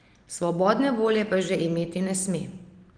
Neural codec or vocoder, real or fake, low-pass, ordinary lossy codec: none; real; 9.9 kHz; Opus, 24 kbps